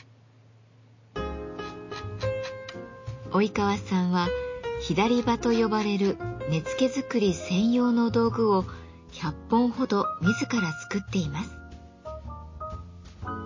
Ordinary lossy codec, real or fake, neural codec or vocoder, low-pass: MP3, 32 kbps; real; none; 7.2 kHz